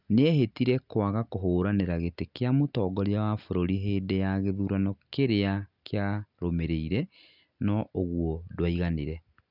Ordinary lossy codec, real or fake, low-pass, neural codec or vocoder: none; real; 5.4 kHz; none